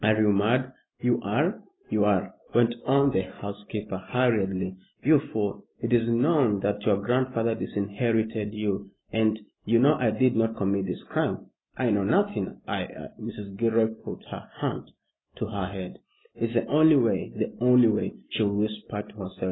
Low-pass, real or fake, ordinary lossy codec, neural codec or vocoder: 7.2 kHz; real; AAC, 16 kbps; none